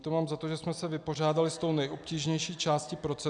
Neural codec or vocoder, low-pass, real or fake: none; 9.9 kHz; real